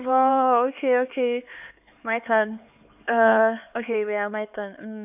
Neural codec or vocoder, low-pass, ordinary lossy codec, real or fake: codec, 16 kHz, 4 kbps, X-Codec, HuBERT features, trained on LibriSpeech; 3.6 kHz; AAC, 32 kbps; fake